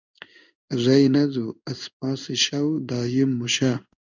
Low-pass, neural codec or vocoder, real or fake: 7.2 kHz; codec, 16 kHz in and 24 kHz out, 1 kbps, XY-Tokenizer; fake